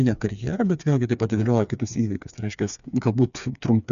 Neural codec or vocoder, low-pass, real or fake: codec, 16 kHz, 4 kbps, FreqCodec, smaller model; 7.2 kHz; fake